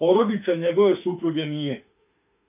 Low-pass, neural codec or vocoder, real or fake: 3.6 kHz; autoencoder, 48 kHz, 32 numbers a frame, DAC-VAE, trained on Japanese speech; fake